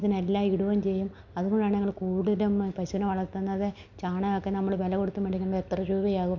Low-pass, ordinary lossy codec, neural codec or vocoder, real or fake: 7.2 kHz; none; none; real